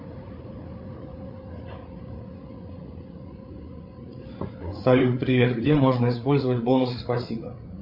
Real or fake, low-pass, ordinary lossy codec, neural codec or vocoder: fake; 5.4 kHz; MP3, 32 kbps; codec, 16 kHz, 8 kbps, FreqCodec, larger model